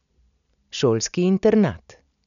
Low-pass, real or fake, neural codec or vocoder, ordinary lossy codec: 7.2 kHz; real; none; none